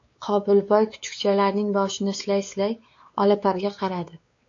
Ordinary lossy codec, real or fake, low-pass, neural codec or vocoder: AAC, 48 kbps; fake; 7.2 kHz; codec, 16 kHz, 4 kbps, X-Codec, WavLM features, trained on Multilingual LibriSpeech